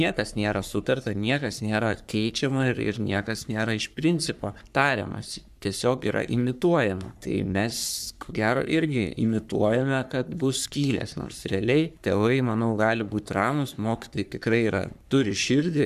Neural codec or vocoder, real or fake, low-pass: codec, 44.1 kHz, 3.4 kbps, Pupu-Codec; fake; 14.4 kHz